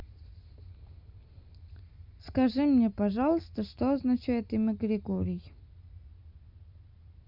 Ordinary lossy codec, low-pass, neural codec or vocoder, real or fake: none; 5.4 kHz; none; real